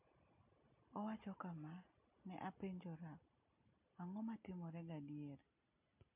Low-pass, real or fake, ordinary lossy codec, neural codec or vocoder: 3.6 kHz; real; MP3, 32 kbps; none